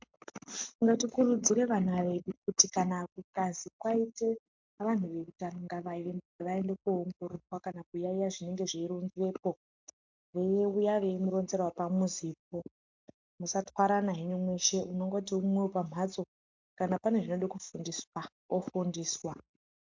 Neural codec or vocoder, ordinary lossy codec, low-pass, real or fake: none; MP3, 64 kbps; 7.2 kHz; real